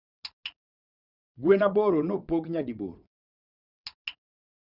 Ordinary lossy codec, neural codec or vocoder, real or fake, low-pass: Opus, 64 kbps; vocoder, 22.05 kHz, 80 mel bands, WaveNeXt; fake; 5.4 kHz